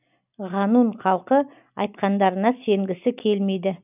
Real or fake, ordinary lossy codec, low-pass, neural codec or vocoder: real; none; 3.6 kHz; none